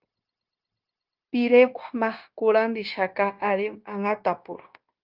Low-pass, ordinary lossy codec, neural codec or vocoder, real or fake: 5.4 kHz; Opus, 24 kbps; codec, 16 kHz, 0.9 kbps, LongCat-Audio-Codec; fake